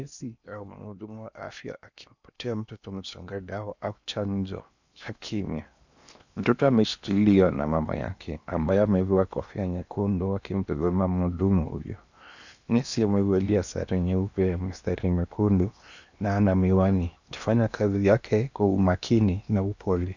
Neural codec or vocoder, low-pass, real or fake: codec, 16 kHz in and 24 kHz out, 0.8 kbps, FocalCodec, streaming, 65536 codes; 7.2 kHz; fake